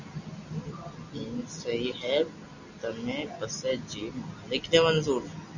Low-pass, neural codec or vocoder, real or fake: 7.2 kHz; none; real